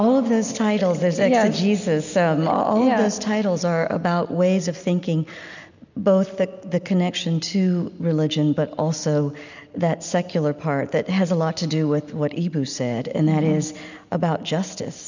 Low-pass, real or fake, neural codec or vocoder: 7.2 kHz; real; none